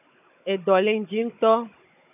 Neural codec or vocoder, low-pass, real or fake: codec, 16 kHz, 16 kbps, FunCodec, trained on Chinese and English, 50 frames a second; 3.6 kHz; fake